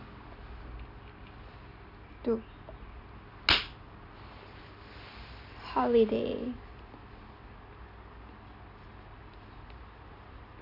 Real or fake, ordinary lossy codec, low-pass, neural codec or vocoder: real; none; 5.4 kHz; none